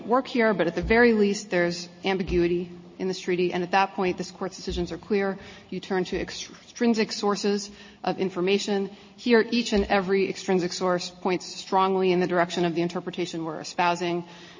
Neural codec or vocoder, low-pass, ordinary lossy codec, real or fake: none; 7.2 kHz; MP3, 32 kbps; real